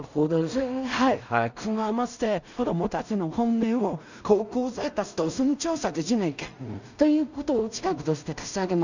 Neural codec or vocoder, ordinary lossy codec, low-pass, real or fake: codec, 16 kHz in and 24 kHz out, 0.4 kbps, LongCat-Audio-Codec, two codebook decoder; none; 7.2 kHz; fake